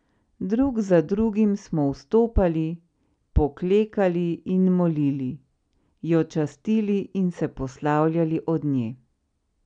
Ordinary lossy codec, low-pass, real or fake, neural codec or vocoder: none; 9.9 kHz; real; none